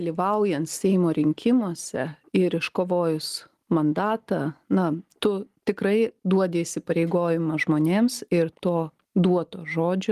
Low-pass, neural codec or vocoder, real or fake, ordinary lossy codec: 14.4 kHz; none; real; Opus, 24 kbps